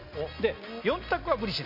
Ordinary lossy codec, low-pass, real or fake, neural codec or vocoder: none; 5.4 kHz; real; none